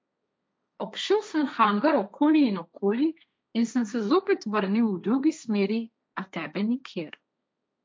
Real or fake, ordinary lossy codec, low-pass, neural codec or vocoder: fake; none; none; codec, 16 kHz, 1.1 kbps, Voila-Tokenizer